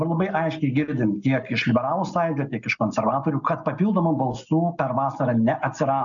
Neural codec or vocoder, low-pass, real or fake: none; 7.2 kHz; real